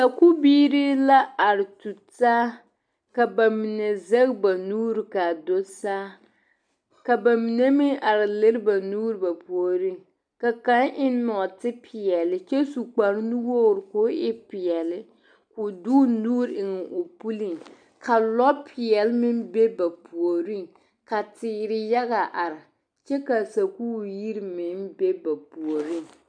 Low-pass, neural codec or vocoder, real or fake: 9.9 kHz; none; real